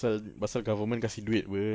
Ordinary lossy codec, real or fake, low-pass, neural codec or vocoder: none; real; none; none